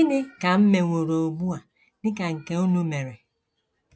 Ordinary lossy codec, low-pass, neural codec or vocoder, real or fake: none; none; none; real